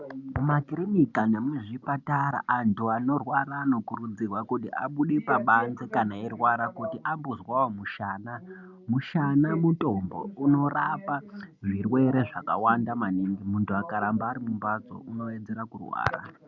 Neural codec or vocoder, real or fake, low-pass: none; real; 7.2 kHz